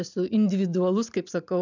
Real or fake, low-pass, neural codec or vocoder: real; 7.2 kHz; none